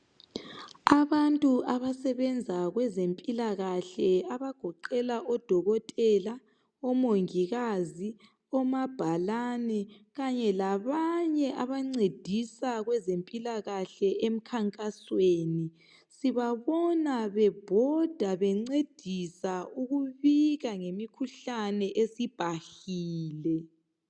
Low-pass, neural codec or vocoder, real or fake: 9.9 kHz; none; real